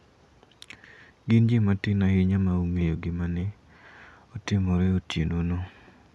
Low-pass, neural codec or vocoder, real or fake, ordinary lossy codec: none; none; real; none